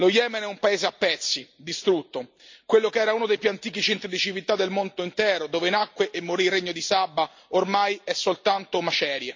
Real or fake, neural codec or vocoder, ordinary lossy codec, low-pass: real; none; MP3, 48 kbps; 7.2 kHz